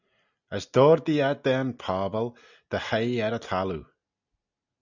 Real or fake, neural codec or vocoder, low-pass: real; none; 7.2 kHz